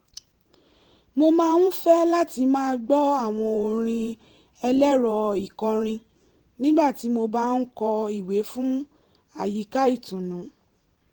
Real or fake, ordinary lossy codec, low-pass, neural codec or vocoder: fake; Opus, 16 kbps; 19.8 kHz; vocoder, 44.1 kHz, 128 mel bands every 512 samples, BigVGAN v2